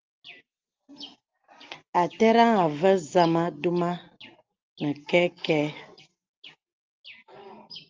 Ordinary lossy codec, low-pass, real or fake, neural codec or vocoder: Opus, 32 kbps; 7.2 kHz; real; none